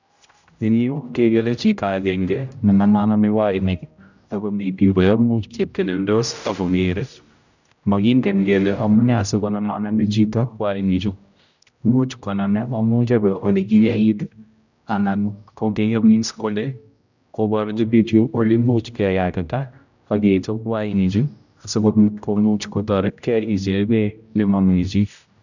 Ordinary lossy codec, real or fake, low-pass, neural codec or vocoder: none; fake; 7.2 kHz; codec, 16 kHz, 0.5 kbps, X-Codec, HuBERT features, trained on general audio